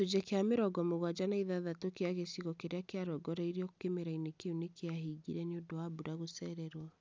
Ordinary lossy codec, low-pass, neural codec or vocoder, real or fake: none; none; none; real